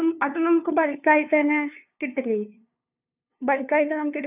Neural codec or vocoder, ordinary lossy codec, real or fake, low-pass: codec, 16 kHz, 2 kbps, FunCodec, trained on LibriTTS, 25 frames a second; none; fake; 3.6 kHz